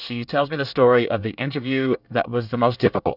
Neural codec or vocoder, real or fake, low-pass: codec, 24 kHz, 1 kbps, SNAC; fake; 5.4 kHz